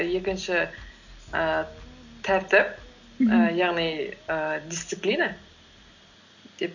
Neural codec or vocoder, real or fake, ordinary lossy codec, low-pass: none; real; none; 7.2 kHz